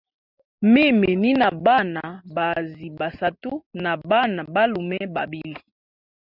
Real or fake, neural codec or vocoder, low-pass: real; none; 5.4 kHz